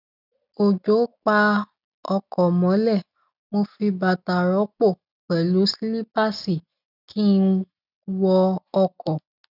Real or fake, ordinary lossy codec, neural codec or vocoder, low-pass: real; none; none; 5.4 kHz